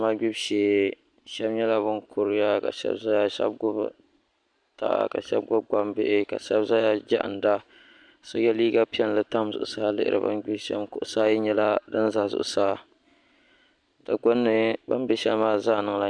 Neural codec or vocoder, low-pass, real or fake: none; 9.9 kHz; real